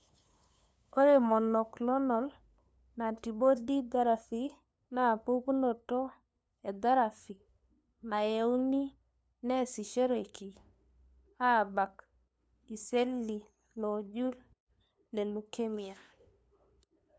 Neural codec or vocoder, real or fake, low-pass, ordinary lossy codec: codec, 16 kHz, 2 kbps, FunCodec, trained on LibriTTS, 25 frames a second; fake; none; none